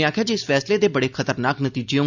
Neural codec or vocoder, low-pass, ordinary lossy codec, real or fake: none; 7.2 kHz; none; real